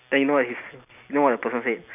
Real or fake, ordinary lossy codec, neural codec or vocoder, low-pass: real; none; none; 3.6 kHz